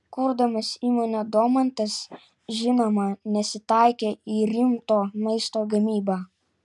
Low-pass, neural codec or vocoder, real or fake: 10.8 kHz; none; real